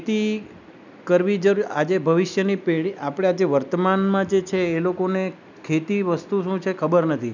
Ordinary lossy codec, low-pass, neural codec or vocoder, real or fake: none; 7.2 kHz; none; real